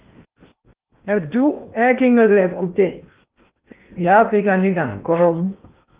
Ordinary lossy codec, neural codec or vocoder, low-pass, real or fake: Opus, 32 kbps; codec, 16 kHz in and 24 kHz out, 0.8 kbps, FocalCodec, streaming, 65536 codes; 3.6 kHz; fake